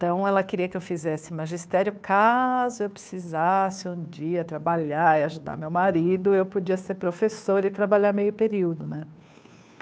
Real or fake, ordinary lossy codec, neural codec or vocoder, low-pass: fake; none; codec, 16 kHz, 2 kbps, FunCodec, trained on Chinese and English, 25 frames a second; none